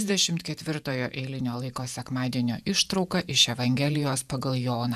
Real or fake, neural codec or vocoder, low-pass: fake; vocoder, 48 kHz, 128 mel bands, Vocos; 14.4 kHz